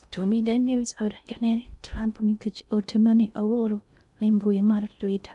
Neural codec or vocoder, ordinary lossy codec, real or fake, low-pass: codec, 16 kHz in and 24 kHz out, 0.6 kbps, FocalCodec, streaming, 2048 codes; none; fake; 10.8 kHz